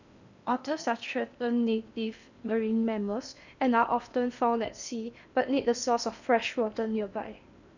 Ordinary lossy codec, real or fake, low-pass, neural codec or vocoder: none; fake; 7.2 kHz; codec, 16 kHz in and 24 kHz out, 0.8 kbps, FocalCodec, streaming, 65536 codes